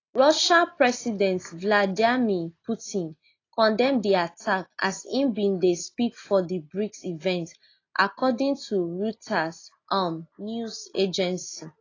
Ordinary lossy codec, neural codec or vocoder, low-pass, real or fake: AAC, 32 kbps; none; 7.2 kHz; real